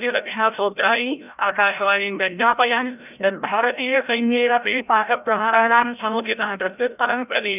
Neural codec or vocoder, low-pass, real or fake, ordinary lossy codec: codec, 16 kHz, 0.5 kbps, FreqCodec, larger model; 3.6 kHz; fake; none